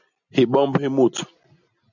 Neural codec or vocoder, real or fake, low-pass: none; real; 7.2 kHz